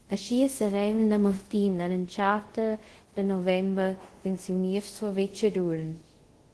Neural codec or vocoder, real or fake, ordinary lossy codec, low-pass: codec, 24 kHz, 0.5 kbps, DualCodec; fake; Opus, 16 kbps; 10.8 kHz